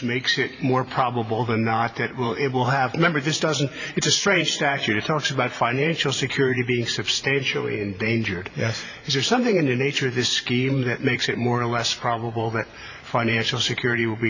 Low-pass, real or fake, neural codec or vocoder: 7.2 kHz; fake; vocoder, 44.1 kHz, 128 mel bands every 512 samples, BigVGAN v2